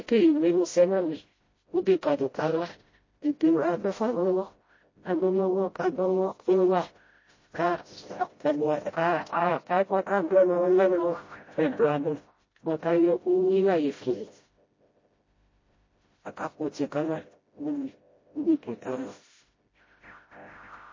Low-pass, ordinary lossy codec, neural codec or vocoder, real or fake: 7.2 kHz; MP3, 32 kbps; codec, 16 kHz, 0.5 kbps, FreqCodec, smaller model; fake